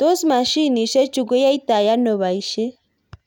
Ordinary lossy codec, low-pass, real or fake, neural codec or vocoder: none; 19.8 kHz; real; none